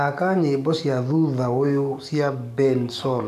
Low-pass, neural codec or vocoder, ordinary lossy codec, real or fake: 14.4 kHz; codec, 44.1 kHz, 7.8 kbps, DAC; none; fake